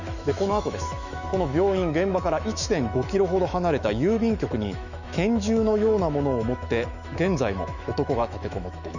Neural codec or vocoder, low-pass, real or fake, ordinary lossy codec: autoencoder, 48 kHz, 128 numbers a frame, DAC-VAE, trained on Japanese speech; 7.2 kHz; fake; none